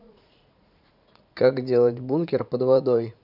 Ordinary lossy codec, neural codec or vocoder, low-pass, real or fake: none; vocoder, 44.1 kHz, 80 mel bands, Vocos; 5.4 kHz; fake